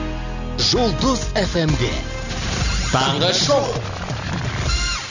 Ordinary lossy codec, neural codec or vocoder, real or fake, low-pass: none; none; real; 7.2 kHz